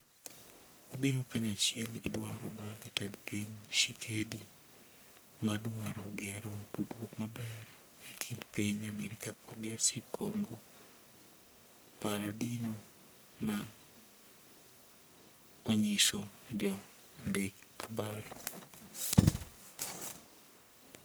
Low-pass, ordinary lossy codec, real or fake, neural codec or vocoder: none; none; fake; codec, 44.1 kHz, 1.7 kbps, Pupu-Codec